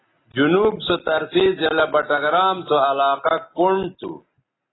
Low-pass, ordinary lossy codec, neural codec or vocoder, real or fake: 7.2 kHz; AAC, 16 kbps; none; real